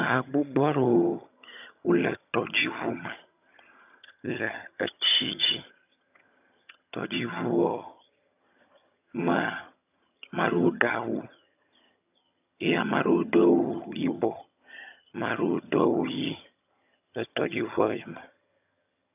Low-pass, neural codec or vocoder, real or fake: 3.6 kHz; vocoder, 22.05 kHz, 80 mel bands, HiFi-GAN; fake